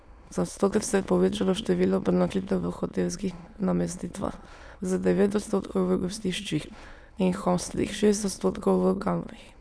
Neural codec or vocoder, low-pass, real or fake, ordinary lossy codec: autoencoder, 22.05 kHz, a latent of 192 numbers a frame, VITS, trained on many speakers; none; fake; none